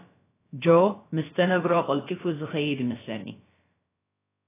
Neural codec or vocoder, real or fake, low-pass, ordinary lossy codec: codec, 16 kHz, about 1 kbps, DyCAST, with the encoder's durations; fake; 3.6 kHz; AAC, 16 kbps